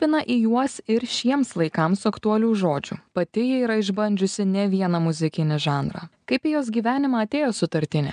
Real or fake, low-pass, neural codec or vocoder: real; 9.9 kHz; none